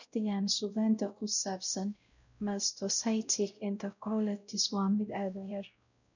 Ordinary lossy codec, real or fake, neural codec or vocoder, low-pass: none; fake; codec, 16 kHz, 0.5 kbps, X-Codec, WavLM features, trained on Multilingual LibriSpeech; 7.2 kHz